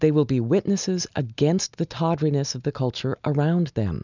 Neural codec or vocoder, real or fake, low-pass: none; real; 7.2 kHz